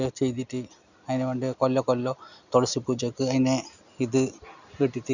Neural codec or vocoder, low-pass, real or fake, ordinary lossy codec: none; 7.2 kHz; real; none